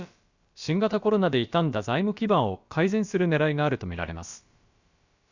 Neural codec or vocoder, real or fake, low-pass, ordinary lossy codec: codec, 16 kHz, about 1 kbps, DyCAST, with the encoder's durations; fake; 7.2 kHz; Opus, 64 kbps